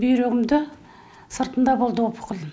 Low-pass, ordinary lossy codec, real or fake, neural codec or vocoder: none; none; real; none